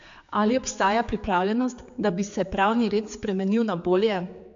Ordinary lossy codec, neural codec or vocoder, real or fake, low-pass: AAC, 64 kbps; codec, 16 kHz, 4 kbps, X-Codec, HuBERT features, trained on general audio; fake; 7.2 kHz